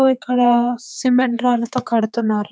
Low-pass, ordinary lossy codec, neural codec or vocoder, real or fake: none; none; codec, 16 kHz, 4 kbps, X-Codec, HuBERT features, trained on general audio; fake